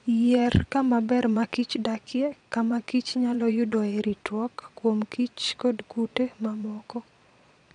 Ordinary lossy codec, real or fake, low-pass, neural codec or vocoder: none; fake; 9.9 kHz; vocoder, 22.05 kHz, 80 mel bands, Vocos